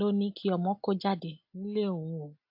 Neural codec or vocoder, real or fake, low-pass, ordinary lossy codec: none; real; 5.4 kHz; AAC, 48 kbps